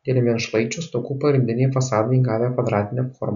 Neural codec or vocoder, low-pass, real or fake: none; 7.2 kHz; real